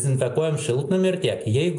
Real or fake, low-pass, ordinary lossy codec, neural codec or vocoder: real; 10.8 kHz; AAC, 64 kbps; none